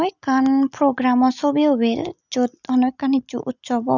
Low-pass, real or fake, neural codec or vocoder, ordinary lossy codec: 7.2 kHz; real; none; none